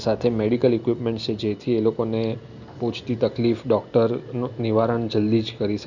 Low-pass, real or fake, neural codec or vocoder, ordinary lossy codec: 7.2 kHz; real; none; none